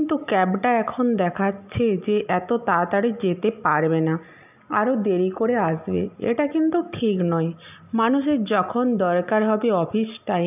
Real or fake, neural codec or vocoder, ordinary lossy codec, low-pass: real; none; none; 3.6 kHz